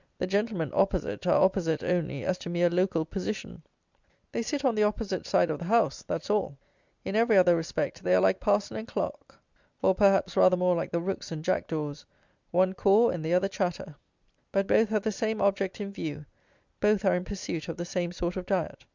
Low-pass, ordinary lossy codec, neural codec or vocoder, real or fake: 7.2 kHz; Opus, 64 kbps; none; real